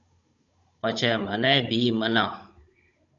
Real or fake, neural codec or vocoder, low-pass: fake; codec, 16 kHz, 16 kbps, FunCodec, trained on Chinese and English, 50 frames a second; 7.2 kHz